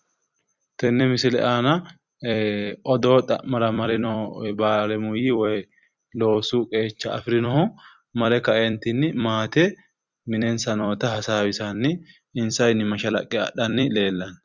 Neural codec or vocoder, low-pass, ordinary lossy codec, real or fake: vocoder, 44.1 kHz, 128 mel bands every 256 samples, BigVGAN v2; 7.2 kHz; Opus, 64 kbps; fake